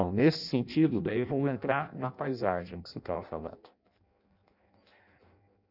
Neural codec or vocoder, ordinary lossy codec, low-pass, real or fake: codec, 16 kHz in and 24 kHz out, 0.6 kbps, FireRedTTS-2 codec; none; 5.4 kHz; fake